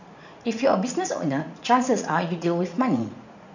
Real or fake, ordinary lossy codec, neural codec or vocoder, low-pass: fake; none; vocoder, 44.1 kHz, 80 mel bands, Vocos; 7.2 kHz